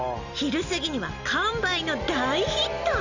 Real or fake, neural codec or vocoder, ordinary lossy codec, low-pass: real; none; Opus, 64 kbps; 7.2 kHz